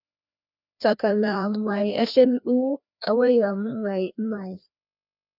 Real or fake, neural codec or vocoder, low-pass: fake; codec, 16 kHz, 1 kbps, FreqCodec, larger model; 5.4 kHz